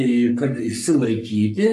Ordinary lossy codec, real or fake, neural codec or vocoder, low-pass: AAC, 96 kbps; fake; codec, 44.1 kHz, 3.4 kbps, Pupu-Codec; 14.4 kHz